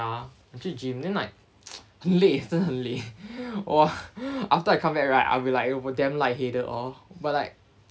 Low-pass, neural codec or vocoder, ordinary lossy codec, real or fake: none; none; none; real